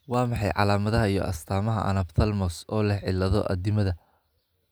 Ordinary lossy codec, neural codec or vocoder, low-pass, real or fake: none; none; none; real